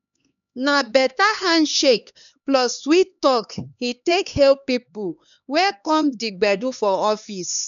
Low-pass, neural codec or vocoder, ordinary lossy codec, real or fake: 7.2 kHz; codec, 16 kHz, 2 kbps, X-Codec, HuBERT features, trained on LibriSpeech; MP3, 96 kbps; fake